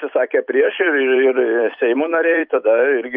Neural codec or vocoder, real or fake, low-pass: vocoder, 44.1 kHz, 128 mel bands every 256 samples, BigVGAN v2; fake; 5.4 kHz